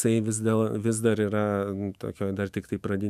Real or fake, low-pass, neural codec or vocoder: fake; 14.4 kHz; autoencoder, 48 kHz, 128 numbers a frame, DAC-VAE, trained on Japanese speech